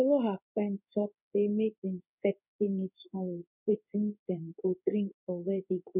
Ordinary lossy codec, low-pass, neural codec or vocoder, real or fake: none; 3.6 kHz; codec, 16 kHz in and 24 kHz out, 1 kbps, XY-Tokenizer; fake